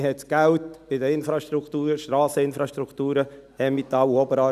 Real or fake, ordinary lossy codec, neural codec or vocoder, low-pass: real; none; none; 14.4 kHz